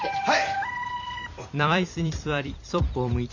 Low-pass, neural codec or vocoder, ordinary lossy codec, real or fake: 7.2 kHz; vocoder, 44.1 kHz, 128 mel bands every 256 samples, BigVGAN v2; none; fake